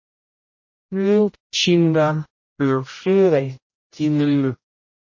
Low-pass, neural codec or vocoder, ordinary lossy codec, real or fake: 7.2 kHz; codec, 16 kHz, 0.5 kbps, X-Codec, HuBERT features, trained on general audio; MP3, 32 kbps; fake